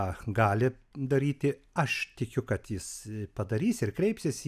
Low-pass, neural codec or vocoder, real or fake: 14.4 kHz; none; real